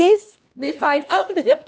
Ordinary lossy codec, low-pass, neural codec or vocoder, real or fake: none; none; codec, 16 kHz, 1 kbps, X-Codec, HuBERT features, trained on LibriSpeech; fake